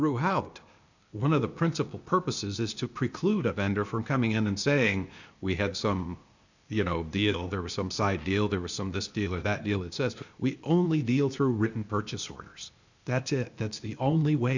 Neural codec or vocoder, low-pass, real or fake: codec, 16 kHz, 0.8 kbps, ZipCodec; 7.2 kHz; fake